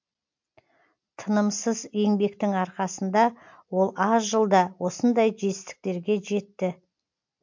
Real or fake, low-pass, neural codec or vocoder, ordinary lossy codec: real; 7.2 kHz; none; MP3, 48 kbps